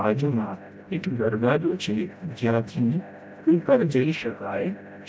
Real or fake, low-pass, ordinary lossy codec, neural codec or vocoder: fake; none; none; codec, 16 kHz, 0.5 kbps, FreqCodec, smaller model